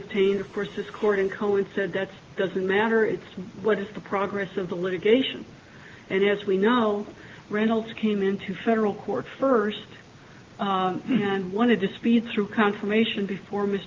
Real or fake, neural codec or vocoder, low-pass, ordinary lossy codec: real; none; 7.2 kHz; Opus, 24 kbps